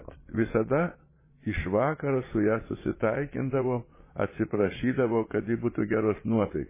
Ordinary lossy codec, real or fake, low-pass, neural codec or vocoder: MP3, 16 kbps; fake; 3.6 kHz; vocoder, 22.05 kHz, 80 mel bands, Vocos